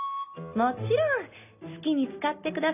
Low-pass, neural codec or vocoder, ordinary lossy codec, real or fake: 3.6 kHz; none; none; real